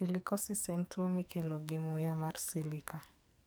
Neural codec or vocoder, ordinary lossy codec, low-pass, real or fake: codec, 44.1 kHz, 2.6 kbps, SNAC; none; none; fake